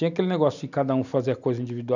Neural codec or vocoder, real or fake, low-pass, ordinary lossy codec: none; real; 7.2 kHz; none